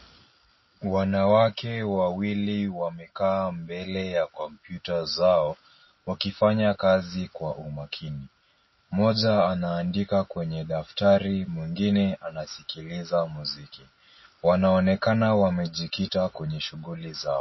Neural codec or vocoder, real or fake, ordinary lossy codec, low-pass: none; real; MP3, 24 kbps; 7.2 kHz